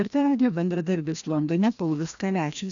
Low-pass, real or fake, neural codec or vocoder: 7.2 kHz; fake; codec, 16 kHz, 1 kbps, FreqCodec, larger model